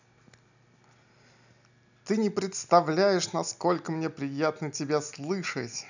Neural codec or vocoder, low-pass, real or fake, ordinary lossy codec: none; 7.2 kHz; real; none